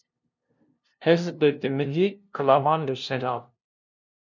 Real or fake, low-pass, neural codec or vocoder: fake; 7.2 kHz; codec, 16 kHz, 0.5 kbps, FunCodec, trained on LibriTTS, 25 frames a second